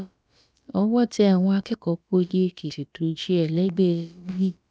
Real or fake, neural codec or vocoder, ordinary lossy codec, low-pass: fake; codec, 16 kHz, about 1 kbps, DyCAST, with the encoder's durations; none; none